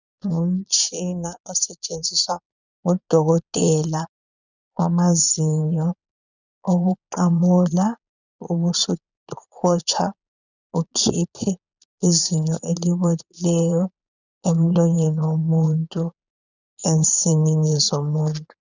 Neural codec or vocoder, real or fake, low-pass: vocoder, 22.05 kHz, 80 mel bands, Vocos; fake; 7.2 kHz